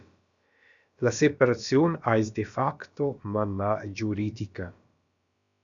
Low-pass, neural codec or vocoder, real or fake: 7.2 kHz; codec, 16 kHz, about 1 kbps, DyCAST, with the encoder's durations; fake